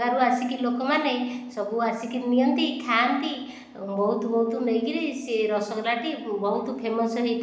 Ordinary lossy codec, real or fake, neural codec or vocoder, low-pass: none; real; none; none